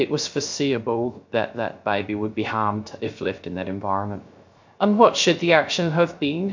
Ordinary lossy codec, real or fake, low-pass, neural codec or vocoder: MP3, 48 kbps; fake; 7.2 kHz; codec, 16 kHz, 0.3 kbps, FocalCodec